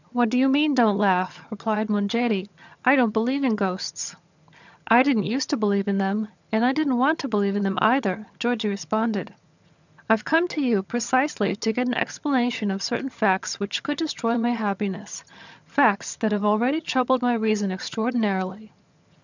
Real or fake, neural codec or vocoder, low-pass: fake; vocoder, 22.05 kHz, 80 mel bands, HiFi-GAN; 7.2 kHz